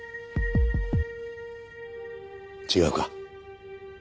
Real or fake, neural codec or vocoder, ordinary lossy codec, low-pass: real; none; none; none